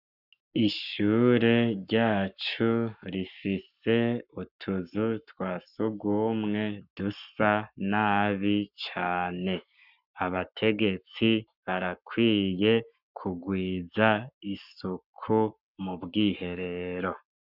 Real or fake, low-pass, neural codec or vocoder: fake; 5.4 kHz; codec, 44.1 kHz, 7.8 kbps, Pupu-Codec